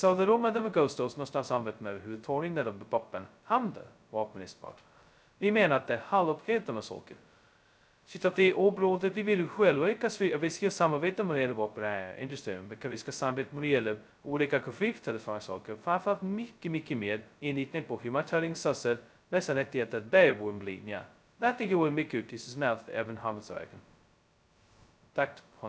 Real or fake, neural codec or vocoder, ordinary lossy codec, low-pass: fake; codec, 16 kHz, 0.2 kbps, FocalCodec; none; none